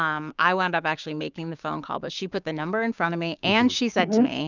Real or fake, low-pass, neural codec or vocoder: fake; 7.2 kHz; codec, 16 kHz, 6 kbps, DAC